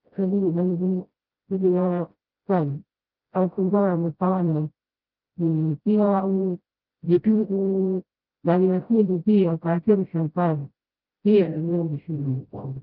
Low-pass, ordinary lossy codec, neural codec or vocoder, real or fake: 5.4 kHz; Opus, 32 kbps; codec, 16 kHz, 0.5 kbps, FreqCodec, smaller model; fake